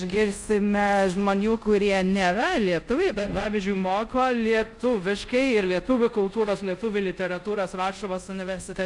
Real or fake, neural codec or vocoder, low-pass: fake; codec, 24 kHz, 0.5 kbps, DualCodec; 10.8 kHz